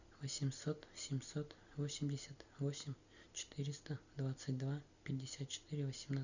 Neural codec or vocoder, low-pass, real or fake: none; 7.2 kHz; real